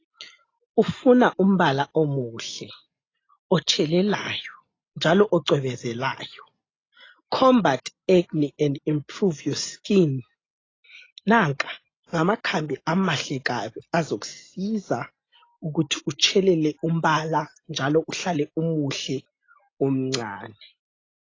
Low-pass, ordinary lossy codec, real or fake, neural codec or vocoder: 7.2 kHz; AAC, 32 kbps; real; none